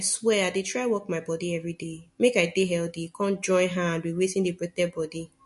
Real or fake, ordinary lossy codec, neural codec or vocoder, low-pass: real; MP3, 48 kbps; none; 14.4 kHz